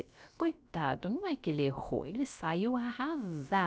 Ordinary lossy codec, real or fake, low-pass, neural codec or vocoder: none; fake; none; codec, 16 kHz, about 1 kbps, DyCAST, with the encoder's durations